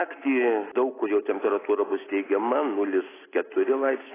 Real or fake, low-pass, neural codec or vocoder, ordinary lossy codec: real; 3.6 kHz; none; AAC, 16 kbps